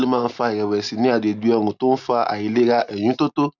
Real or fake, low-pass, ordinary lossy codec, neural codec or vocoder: real; 7.2 kHz; none; none